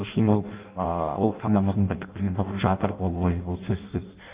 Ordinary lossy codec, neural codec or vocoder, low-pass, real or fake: Opus, 24 kbps; codec, 16 kHz in and 24 kHz out, 0.6 kbps, FireRedTTS-2 codec; 3.6 kHz; fake